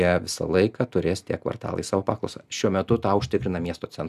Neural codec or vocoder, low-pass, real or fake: none; 14.4 kHz; real